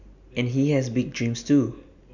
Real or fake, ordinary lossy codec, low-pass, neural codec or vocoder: real; none; 7.2 kHz; none